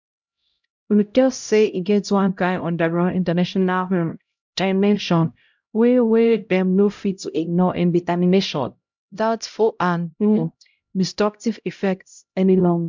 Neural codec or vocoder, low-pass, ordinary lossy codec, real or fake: codec, 16 kHz, 0.5 kbps, X-Codec, HuBERT features, trained on LibriSpeech; 7.2 kHz; MP3, 64 kbps; fake